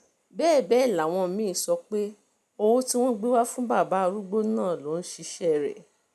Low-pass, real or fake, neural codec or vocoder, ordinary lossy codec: 14.4 kHz; real; none; none